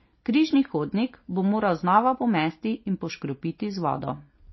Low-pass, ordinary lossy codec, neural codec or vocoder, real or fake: 7.2 kHz; MP3, 24 kbps; none; real